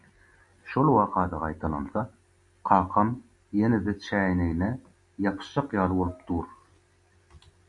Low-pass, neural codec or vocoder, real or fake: 10.8 kHz; none; real